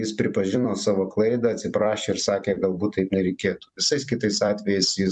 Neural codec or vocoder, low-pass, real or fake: vocoder, 44.1 kHz, 128 mel bands every 256 samples, BigVGAN v2; 10.8 kHz; fake